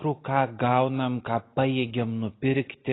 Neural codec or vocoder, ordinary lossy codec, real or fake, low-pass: none; AAC, 16 kbps; real; 7.2 kHz